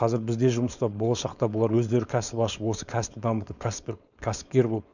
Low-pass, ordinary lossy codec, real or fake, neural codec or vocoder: 7.2 kHz; none; fake; codec, 16 kHz, 4.8 kbps, FACodec